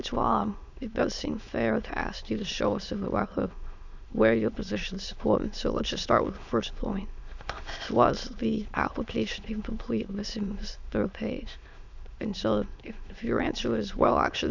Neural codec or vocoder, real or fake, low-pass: autoencoder, 22.05 kHz, a latent of 192 numbers a frame, VITS, trained on many speakers; fake; 7.2 kHz